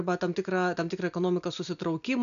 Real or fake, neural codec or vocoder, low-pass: real; none; 7.2 kHz